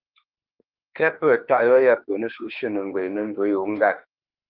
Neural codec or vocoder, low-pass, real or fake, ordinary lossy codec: autoencoder, 48 kHz, 32 numbers a frame, DAC-VAE, trained on Japanese speech; 5.4 kHz; fake; Opus, 24 kbps